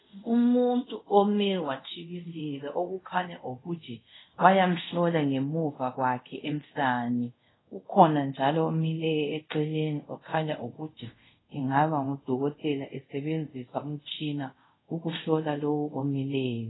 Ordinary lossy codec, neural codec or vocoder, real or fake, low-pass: AAC, 16 kbps; codec, 24 kHz, 0.5 kbps, DualCodec; fake; 7.2 kHz